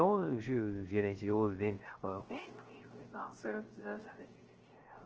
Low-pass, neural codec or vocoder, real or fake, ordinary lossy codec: 7.2 kHz; codec, 16 kHz, 0.3 kbps, FocalCodec; fake; Opus, 16 kbps